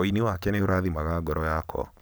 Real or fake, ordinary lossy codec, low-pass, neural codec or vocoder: real; none; none; none